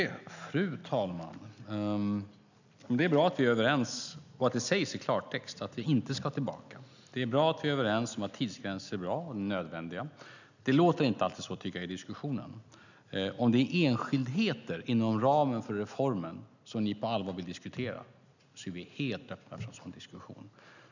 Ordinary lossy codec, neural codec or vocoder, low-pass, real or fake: none; none; 7.2 kHz; real